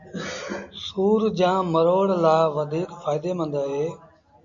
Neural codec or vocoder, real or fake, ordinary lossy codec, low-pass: none; real; AAC, 64 kbps; 7.2 kHz